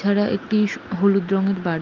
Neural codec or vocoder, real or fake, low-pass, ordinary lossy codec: none; real; 7.2 kHz; Opus, 32 kbps